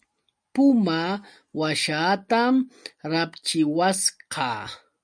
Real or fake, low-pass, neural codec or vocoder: real; 9.9 kHz; none